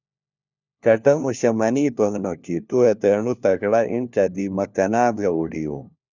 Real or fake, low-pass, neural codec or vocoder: fake; 7.2 kHz; codec, 16 kHz, 1 kbps, FunCodec, trained on LibriTTS, 50 frames a second